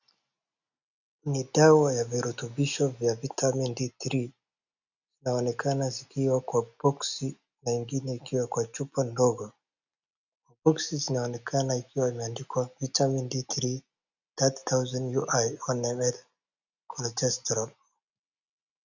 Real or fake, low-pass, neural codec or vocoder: real; 7.2 kHz; none